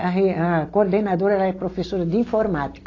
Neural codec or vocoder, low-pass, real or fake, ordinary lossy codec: none; 7.2 kHz; real; AAC, 32 kbps